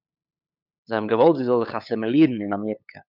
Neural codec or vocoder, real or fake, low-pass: codec, 16 kHz, 8 kbps, FunCodec, trained on LibriTTS, 25 frames a second; fake; 5.4 kHz